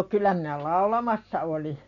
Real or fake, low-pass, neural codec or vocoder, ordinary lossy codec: real; 7.2 kHz; none; none